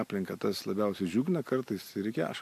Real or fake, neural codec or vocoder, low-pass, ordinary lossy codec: real; none; 14.4 kHz; AAC, 64 kbps